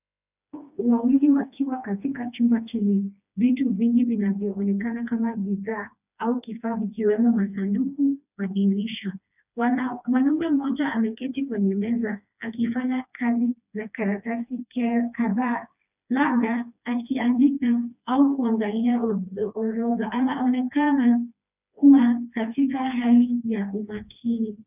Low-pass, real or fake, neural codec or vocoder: 3.6 kHz; fake; codec, 16 kHz, 2 kbps, FreqCodec, smaller model